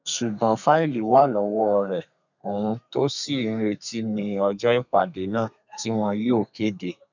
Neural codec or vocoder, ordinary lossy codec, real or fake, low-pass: codec, 32 kHz, 1.9 kbps, SNAC; none; fake; 7.2 kHz